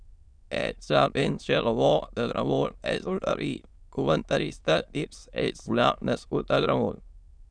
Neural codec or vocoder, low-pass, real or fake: autoencoder, 22.05 kHz, a latent of 192 numbers a frame, VITS, trained on many speakers; 9.9 kHz; fake